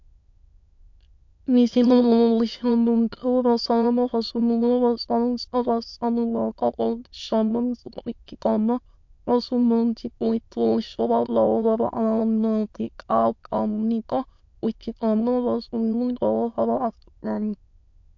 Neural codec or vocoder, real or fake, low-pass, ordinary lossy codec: autoencoder, 22.05 kHz, a latent of 192 numbers a frame, VITS, trained on many speakers; fake; 7.2 kHz; MP3, 48 kbps